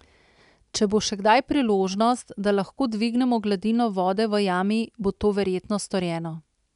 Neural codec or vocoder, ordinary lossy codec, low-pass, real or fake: none; none; 10.8 kHz; real